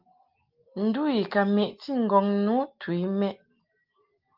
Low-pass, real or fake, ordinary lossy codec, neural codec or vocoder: 5.4 kHz; real; Opus, 24 kbps; none